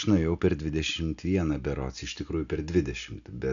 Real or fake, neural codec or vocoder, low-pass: real; none; 7.2 kHz